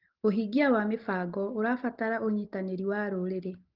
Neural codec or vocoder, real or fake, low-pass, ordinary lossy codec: none; real; 5.4 kHz; Opus, 16 kbps